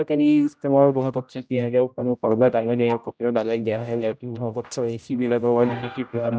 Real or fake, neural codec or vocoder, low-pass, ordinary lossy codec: fake; codec, 16 kHz, 0.5 kbps, X-Codec, HuBERT features, trained on general audio; none; none